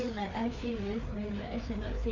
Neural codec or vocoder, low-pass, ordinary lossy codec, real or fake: codec, 16 kHz, 4 kbps, FreqCodec, larger model; 7.2 kHz; none; fake